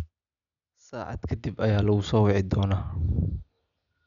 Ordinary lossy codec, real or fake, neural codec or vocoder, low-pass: none; real; none; 7.2 kHz